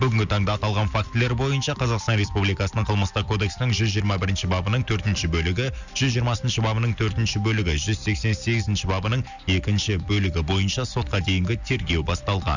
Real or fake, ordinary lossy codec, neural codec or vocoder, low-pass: real; none; none; 7.2 kHz